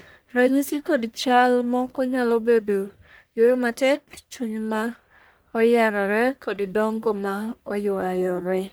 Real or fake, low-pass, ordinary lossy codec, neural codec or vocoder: fake; none; none; codec, 44.1 kHz, 1.7 kbps, Pupu-Codec